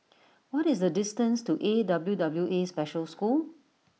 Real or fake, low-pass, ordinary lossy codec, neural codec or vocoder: real; none; none; none